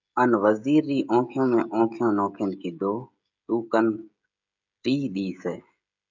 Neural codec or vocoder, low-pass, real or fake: codec, 16 kHz, 16 kbps, FreqCodec, smaller model; 7.2 kHz; fake